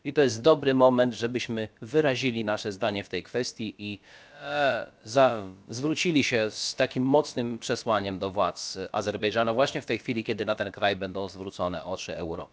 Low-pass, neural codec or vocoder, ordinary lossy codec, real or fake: none; codec, 16 kHz, about 1 kbps, DyCAST, with the encoder's durations; none; fake